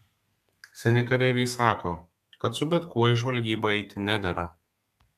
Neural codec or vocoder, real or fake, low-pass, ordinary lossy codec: codec, 32 kHz, 1.9 kbps, SNAC; fake; 14.4 kHz; MP3, 96 kbps